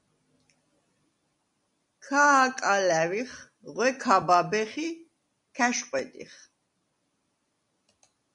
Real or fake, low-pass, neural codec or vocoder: real; 10.8 kHz; none